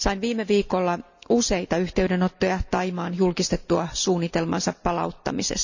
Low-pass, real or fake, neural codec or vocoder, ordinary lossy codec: 7.2 kHz; real; none; none